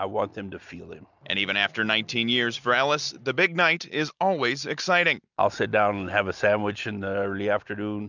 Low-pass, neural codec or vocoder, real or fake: 7.2 kHz; none; real